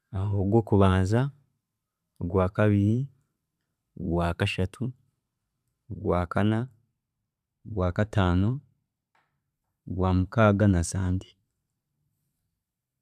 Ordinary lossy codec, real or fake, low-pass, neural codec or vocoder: none; fake; 14.4 kHz; vocoder, 44.1 kHz, 128 mel bands every 512 samples, BigVGAN v2